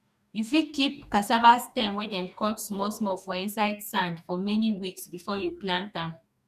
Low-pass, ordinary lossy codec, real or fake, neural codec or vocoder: 14.4 kHz; none; fake; codec, 44.1 kHz, 2.6 kbps, DAC